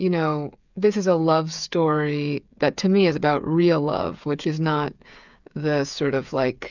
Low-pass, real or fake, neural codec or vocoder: 7.2 kHz; fake; codec, 16 kHz, 8 kbps, FreqCodec, smaller model